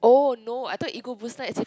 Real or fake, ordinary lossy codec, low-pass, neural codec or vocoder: real; none; none; none